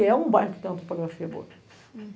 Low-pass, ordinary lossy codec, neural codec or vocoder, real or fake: none; none; none; real